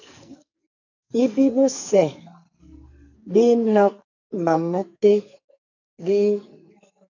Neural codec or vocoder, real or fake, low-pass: codec, 44.1 kHz, 2.6 kbps, SNAC; fake; 7.2 kHz